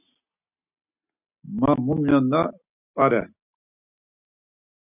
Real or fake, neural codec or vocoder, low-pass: real; none; 3.6 kHz